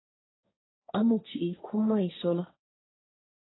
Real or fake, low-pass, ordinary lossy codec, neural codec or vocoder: fake; 7.2 kHz; AAC, 16 kbps; codec, 16 kHz, 1.1 kbps, Voila-Tokenizer